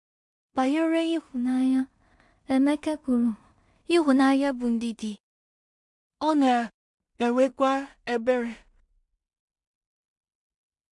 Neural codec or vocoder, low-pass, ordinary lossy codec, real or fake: codec, 16 kHz in and 24 kHz out, 0.4 kbps, LongCat-Audio-Codec, two codebook decoder; 10.8 kHz; MP3, 64 kbps; fake